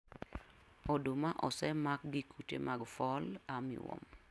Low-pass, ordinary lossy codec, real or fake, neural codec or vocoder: none; none; real; none